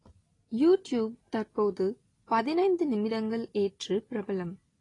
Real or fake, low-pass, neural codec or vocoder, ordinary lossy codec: real; 10.8 kHz; none; AAC, 32 kbps